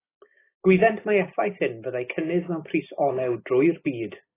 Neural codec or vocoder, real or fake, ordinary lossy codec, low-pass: none; real; AAC, 16 kbps; 3.6 kHz